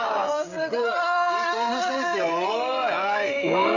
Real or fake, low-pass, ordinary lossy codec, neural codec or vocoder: fake; 7.2 kHz; none; codec, 16 kHz, 16 kbps, FreqCodec, smaller model